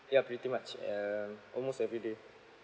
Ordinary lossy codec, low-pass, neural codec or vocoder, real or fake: none; none; none; real